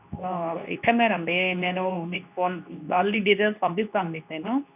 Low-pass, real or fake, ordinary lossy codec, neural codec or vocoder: 3.6 kHz; fake; none; codec, 24 kHz, 0.9 kbps, WavTokenizer, medium speech release version 2